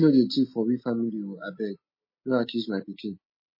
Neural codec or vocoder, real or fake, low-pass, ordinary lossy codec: none; real; 5.4 kHz; MP3, 24 kbps